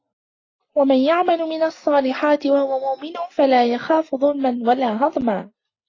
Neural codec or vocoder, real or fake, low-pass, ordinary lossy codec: none; real; 7.2 kHz; AAC, 32 kbps